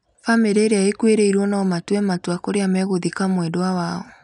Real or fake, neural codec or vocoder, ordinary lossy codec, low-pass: real; none; none; 10.8 kHz